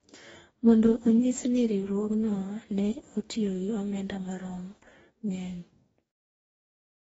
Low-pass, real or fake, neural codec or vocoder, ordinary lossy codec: 19.8 kHz; fake; codec, 44.1 kHz, 2.6 kbps, DAC; AAC, 24 kbps